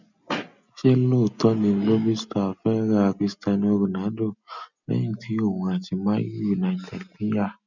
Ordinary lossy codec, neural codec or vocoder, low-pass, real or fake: none; none; 7.2 kHz; real